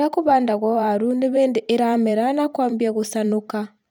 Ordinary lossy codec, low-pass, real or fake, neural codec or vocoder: none; none; fake; vocoder, 44.1 kHz, 128 mel bands every 512 samples, BigVGAN v2